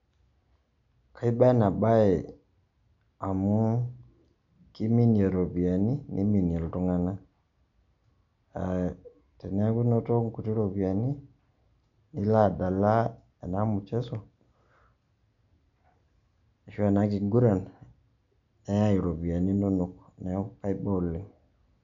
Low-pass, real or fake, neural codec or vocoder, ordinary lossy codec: 7.2 kHz; real; none; none